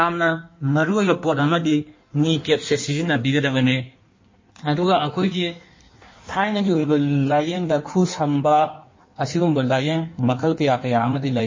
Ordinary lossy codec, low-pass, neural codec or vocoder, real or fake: MP3, 32 kbps; 7.2 kHz; codec, 16 kHz in and 24 kHz out, 1.1 kbps, FireRedTTS-2 codec; fake